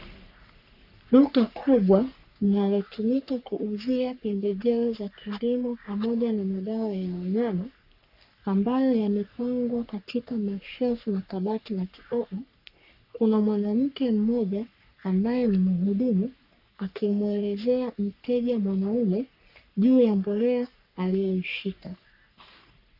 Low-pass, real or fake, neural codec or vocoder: 5.4 kHz; fake; codec, 44.1 kHz, 3.4 kbps, Pupu-Codec